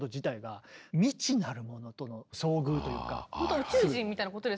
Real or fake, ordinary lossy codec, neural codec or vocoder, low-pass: real; none; none; none